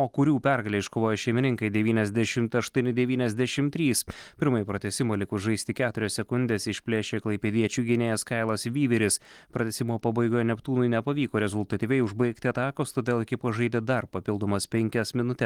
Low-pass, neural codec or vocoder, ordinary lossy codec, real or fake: 19.8 kHz; none; Opus, 24 kbps; real